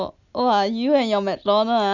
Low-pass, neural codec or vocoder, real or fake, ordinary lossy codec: 7.2 kHz; none; real; none